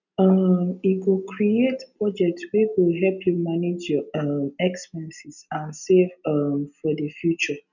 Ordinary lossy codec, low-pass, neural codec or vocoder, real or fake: none; 7.2 kHz; none; real